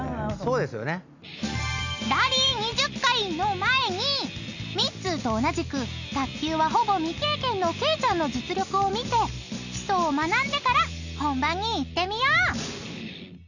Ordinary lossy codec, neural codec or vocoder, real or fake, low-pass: none; none; real; 7.2 kHz